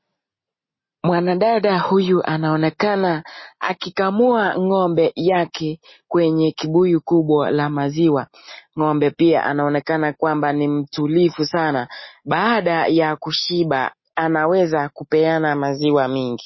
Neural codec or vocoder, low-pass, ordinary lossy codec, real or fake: none; 7.2 kHz; MP3, 24 kbps; real